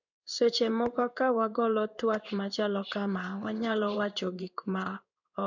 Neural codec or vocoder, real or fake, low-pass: codec, 16 kHz in and 24 kHz out, 1 kbps, XY-Tokenizer; fake; 7.2 kHz